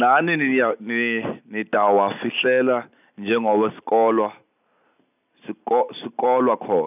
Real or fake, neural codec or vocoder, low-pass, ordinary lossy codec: real; none; 3.6 kHz; none